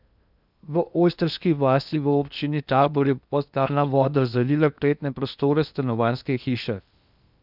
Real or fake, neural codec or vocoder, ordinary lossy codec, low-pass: fake; codec, 16 kHz in and 24 kHz out, 0.8 kbps, FocalCodec, streaming, 65536 codes; none; 5.4 kHz